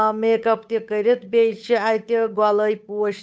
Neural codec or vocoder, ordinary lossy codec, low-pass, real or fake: codec, 16 kHz, 8 kbps, FunCodec, trained on Chinese and English, 25 frames a second; none; none; fake